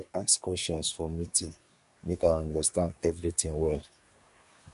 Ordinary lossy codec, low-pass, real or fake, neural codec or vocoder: none; 10.8 kHz; fake; codec, 24 kHz, 1 kbps, SNAC